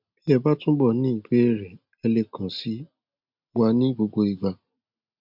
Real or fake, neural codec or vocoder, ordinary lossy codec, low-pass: real; none; MP3, 48 kbps; 5.4 kHz